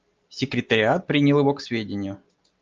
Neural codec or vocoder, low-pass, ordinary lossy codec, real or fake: none; 7.2 kHz; Opus, 24 kbps; real